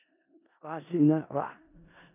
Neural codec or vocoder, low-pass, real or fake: codec, 16 kHz in and 24 kHz out, 0.4 kbps, LongCat-Audio-Codec, four codebook decoder; 3.6 kHz; fake